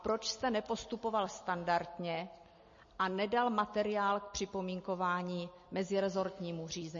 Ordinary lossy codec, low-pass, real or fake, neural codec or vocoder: MP3, 32 kbps; 7.2 kHz; real; none